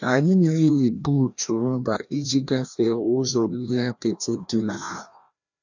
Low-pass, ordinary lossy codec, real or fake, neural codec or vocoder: 7.2 kHz; none; fake; codec, 16 kHz, 1 kbps, FreqCodec, larger model